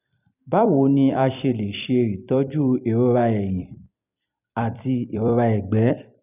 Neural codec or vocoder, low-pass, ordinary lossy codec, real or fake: vocoder, 44.1 kHz, 128 mel bands every 256 samples, BigVGAN v2; 3.6 kHz; none; fake